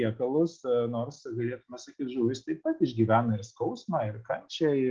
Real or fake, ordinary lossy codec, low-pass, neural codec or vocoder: real; Opus, 16 kbps; 7.2 kHz; none